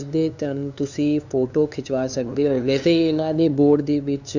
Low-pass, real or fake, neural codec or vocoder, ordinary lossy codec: 7.2 kHz; fake; codec, 16 kHz, 2 kbps, FunCodec, trained on LibriTTS, 25 frames a second; none